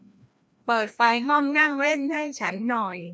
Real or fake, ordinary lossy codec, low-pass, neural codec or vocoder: fake; none; none; codec, 16 kHz, 1 kbps, FreqCodec, larger model